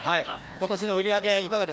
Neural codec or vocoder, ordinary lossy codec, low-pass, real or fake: codec, 16 kHz, 1 kbps, FreqCodec, larger model; none; none; fake